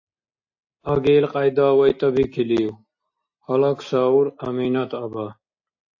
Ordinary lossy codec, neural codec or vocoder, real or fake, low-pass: AAC, 48 kbps; none; real; 7.2 kHz